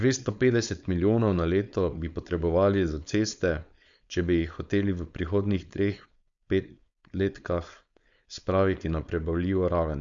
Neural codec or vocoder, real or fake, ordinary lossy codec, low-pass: codec, 16 kHz, 4.8 kbps, FACodec; fake; Opus, 64 kbps; 7.2 kHz